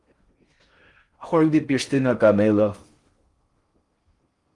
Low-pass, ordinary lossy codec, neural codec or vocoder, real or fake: 10.8 kHz; Opus, 24 kbps; codec, 16 kHz in and 24 kHz out, 0.6 kbps, FocalCodec, streaming, 4096 codes; fake